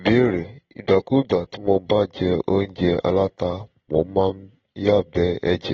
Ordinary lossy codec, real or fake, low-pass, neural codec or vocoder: AAC, 24 kbps; real; 7.2 kHz; none